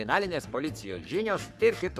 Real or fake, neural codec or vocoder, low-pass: fake; codec, 44.1 kHz, 3.4 kbps, Pupu-Codec; 14.4 kHz